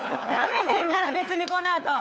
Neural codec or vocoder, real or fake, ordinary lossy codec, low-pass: codec, 16 kHz, 4 kbps, FunCodec, trained on LibriTTS, 50 frames a second; fake; none; none